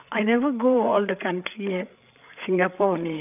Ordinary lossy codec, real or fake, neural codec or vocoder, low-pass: none; fake; codec, 16 kHz, 8 kbps, FreqCodec, larger model; 3.6 kHz